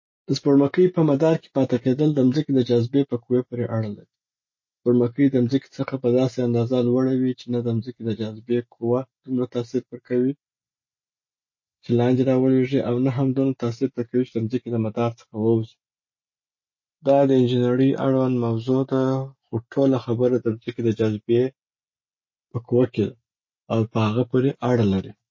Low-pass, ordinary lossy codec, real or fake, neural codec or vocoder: 7.2 kHz; MP3, 32 kbps; real; none